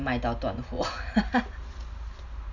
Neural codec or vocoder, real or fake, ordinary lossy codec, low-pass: none; real; none; 7.2 kHz